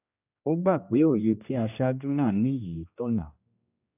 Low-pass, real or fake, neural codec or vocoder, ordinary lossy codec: 3.6 kHz; fake; codec, 16 kHz, 1 kbps, X-Codec, HuBERT features, trained on general audio; AAC, 32 kbps